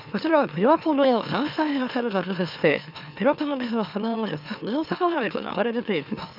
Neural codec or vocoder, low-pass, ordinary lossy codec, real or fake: autoencoder, 44.1 kHz, a latent of 192 numbers a frame, MeloTTS; 5.4 kHz; none; fake